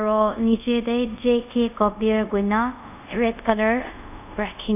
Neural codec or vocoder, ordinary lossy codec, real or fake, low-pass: codec, 24 kHz, 0.5 kbps, DualCodec; none; fake; 3.6 kHz